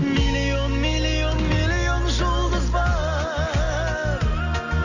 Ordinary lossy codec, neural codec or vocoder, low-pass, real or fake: none; none; 7.2 kHz; real